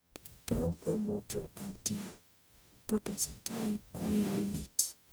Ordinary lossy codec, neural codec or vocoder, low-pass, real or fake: none; codec, 44.1 kHz, 0.9 kbps, DAC; none; fake